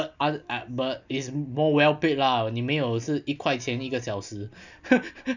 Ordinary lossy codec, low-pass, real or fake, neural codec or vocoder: none; 7.2 kHz; real; none